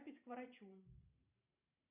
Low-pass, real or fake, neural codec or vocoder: 3.6 kHz; fake; vocoder, 44.1 kHz, 128 mel bands every 512 samples, BigVGAN v2